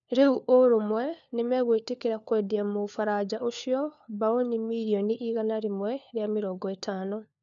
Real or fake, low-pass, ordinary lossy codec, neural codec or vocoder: fake; 7.2 kHz; none; codec, 16 kHz, 4 kbps, FunCodec, trained on LibriTTS, 50 frames a second